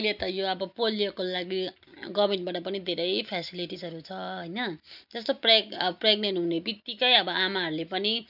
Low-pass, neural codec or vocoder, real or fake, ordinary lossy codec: 5.4 kHz; none; real; none